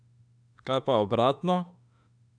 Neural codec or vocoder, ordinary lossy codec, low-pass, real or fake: autoencoder, 48 kHz, 32 numbers a frame, DAC-VAE, trained on Japanese speech; none; 9.9 kHz; fake